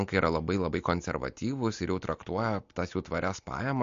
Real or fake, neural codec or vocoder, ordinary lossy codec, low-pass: real; none; MP3, 48 kbps; 7.2 kHz